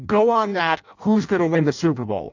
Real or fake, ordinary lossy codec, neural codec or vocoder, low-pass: fake; AAC, 48 kbps; codec, 16 kHz in and 24 kHz out, 0.6 kbps, FireRedTTS-2 codec; 7.2 kHz